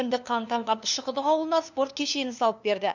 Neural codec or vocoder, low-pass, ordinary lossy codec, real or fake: codec, 16 kHz, 2 kbps, FunCodec, trained on LibriTTS, 25 frames a second; 7.2 kHz; none; fake